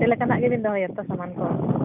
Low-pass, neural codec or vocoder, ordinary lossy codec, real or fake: 3.6 kHz; none; none; real